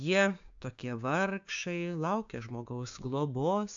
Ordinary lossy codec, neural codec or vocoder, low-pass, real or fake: AAC, 64 kbps; codec, 16 kHz, 6 kbps, DAC; 7.2 kHz; fake